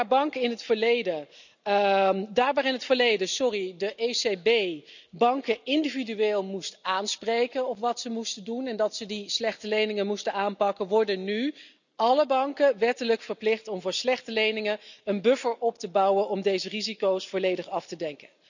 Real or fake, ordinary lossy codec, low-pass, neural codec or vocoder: real; none; 7.2 kHz; none